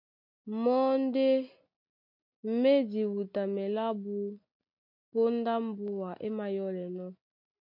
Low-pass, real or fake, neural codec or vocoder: 5.4 kHz; real; none